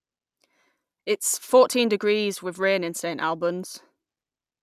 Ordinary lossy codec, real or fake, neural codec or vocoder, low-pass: none; real; none; 14.4 kHz